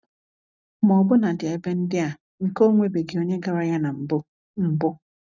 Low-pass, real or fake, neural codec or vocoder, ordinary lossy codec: 7.2 kHz; real; none; none